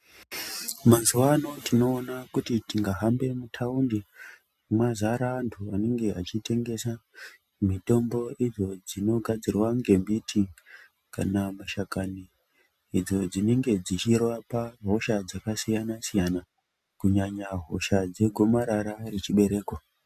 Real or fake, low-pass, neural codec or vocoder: real; 14.4 kHz; none